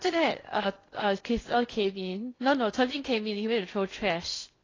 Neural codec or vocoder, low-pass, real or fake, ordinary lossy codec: codec, 16 kHz in and 24 kHz out, 0.6 kbps, FocalCodec, streaming, 2048 codes; 7.2 kHz; fake; AAC, 32 kbps